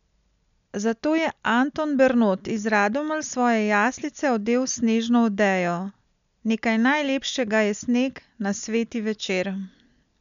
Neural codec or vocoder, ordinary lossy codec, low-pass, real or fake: none; none; 7.2 kHz; real